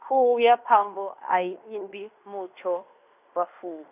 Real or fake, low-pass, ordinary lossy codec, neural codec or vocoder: fake; 3.6 kHz; none; codec, 16 kHz in and 24 kHz out, 0.9 kbps, LongCat-Audio-Codec, fine tuned four codebook decoder